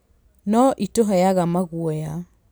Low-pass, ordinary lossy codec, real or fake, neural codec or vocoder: none; none; real; none